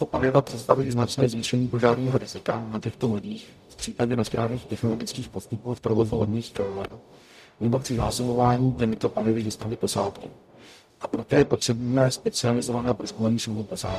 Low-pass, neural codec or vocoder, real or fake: 14.4 kHz; codec, 44.1 kHz, 0.9 kbps, DAC; fake